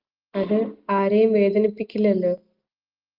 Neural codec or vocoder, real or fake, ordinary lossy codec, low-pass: none; real; Opus, 32 kbps; 5.4 kHz